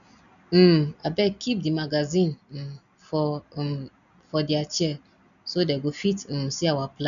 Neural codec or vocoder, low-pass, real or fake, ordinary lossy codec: none; 7.2 kHz; real; none